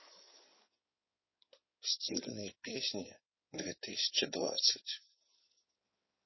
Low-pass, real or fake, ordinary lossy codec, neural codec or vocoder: 7.2 kHz; fake; MP3, 24 kbps; codec, 16 kHz in and 24 kHz out, 2.2 kbps, FireRedTTS-2 codec